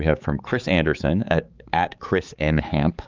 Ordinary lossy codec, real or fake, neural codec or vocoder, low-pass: Opus, 24 kbps; fake; codec, 16 kHz, 4 kbps, X-Codec, HuBERT features, trained on balanced general audio; 7.2 kHz